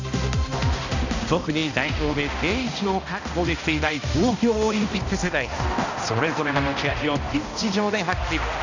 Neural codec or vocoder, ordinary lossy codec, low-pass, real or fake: codec, 16 kHz, 1 kbps, X-Codec, HuBERT features, trained on balanced general audio; none; 7.2 kHz; fake